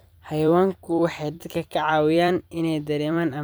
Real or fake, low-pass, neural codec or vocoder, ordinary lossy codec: fake; none; vocoder, 44.1 kHz, 128 mel bands every 256 samples, BigVGAN v2; none